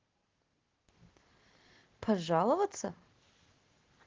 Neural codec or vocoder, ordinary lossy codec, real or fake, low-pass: none; Opus, 16 kbps; real; 7.2 kHz